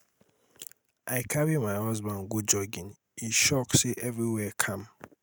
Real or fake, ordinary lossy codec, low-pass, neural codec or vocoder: real; none; none; none